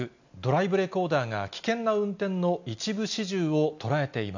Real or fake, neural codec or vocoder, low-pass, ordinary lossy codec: real; none; 7.2 kHz; none